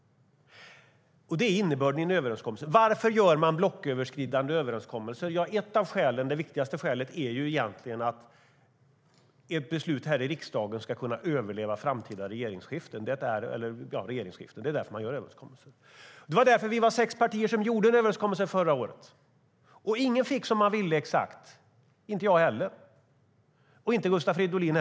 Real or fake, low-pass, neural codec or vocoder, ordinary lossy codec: real; none; none; none